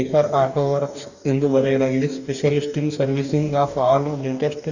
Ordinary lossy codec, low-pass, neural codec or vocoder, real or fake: none; 7.2 kHz; codec, 44.1 kHz, 2.6 kbps, DAC; fake